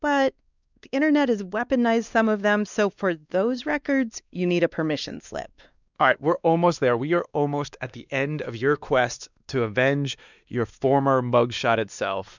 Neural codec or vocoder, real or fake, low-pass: codec, 16 kHz, 2 kbps, X-Codec, WavLM features, trained on Multilingual LibriSpeech; fake; 7.2 kHz